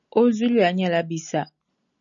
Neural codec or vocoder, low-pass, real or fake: none; 7.2 kHz; real